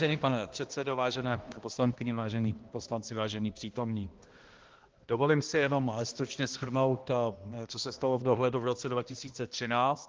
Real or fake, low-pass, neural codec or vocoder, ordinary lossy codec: fake; 7.2 kHz; codec, 16 kHz, 1 kbps, X-Codec, HuBERT features, trained on balanced general audio; Opus, 16 kbps